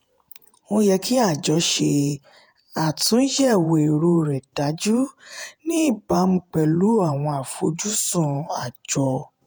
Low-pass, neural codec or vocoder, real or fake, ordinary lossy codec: none; vocoder, 48 kHz, 128 mel bands, Vocos; fake; none